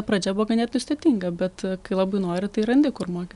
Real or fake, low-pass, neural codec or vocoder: real; 10.8 kHz; none